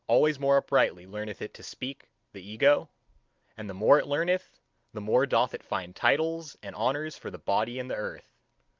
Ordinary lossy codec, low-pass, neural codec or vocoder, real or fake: Opus, 32 kbps; 7.2 kHz; none; real